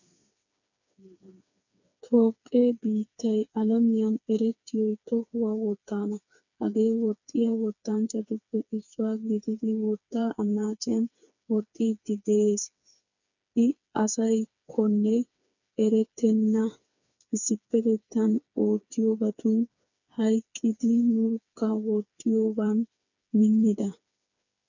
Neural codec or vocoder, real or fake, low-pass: codec, 16 kHz, 4 kbps, FreqCodec, smaller model; fake; 7.2 kHz